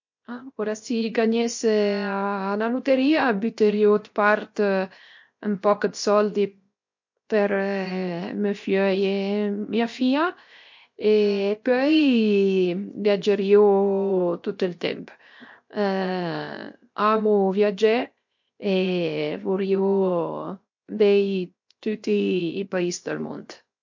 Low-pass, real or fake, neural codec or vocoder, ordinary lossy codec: 7.2 kHz; fake; codec, 16 kHz, 0.7 kbps, FocalCodec; MP3, 48 kbps